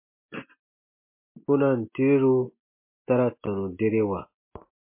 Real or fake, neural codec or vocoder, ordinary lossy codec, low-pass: real; none; MP3, 24 kbps; 3.6 kHz